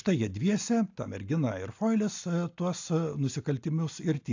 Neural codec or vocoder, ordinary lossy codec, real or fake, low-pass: none; AAC, 48 kbps; real; 7.2 kHz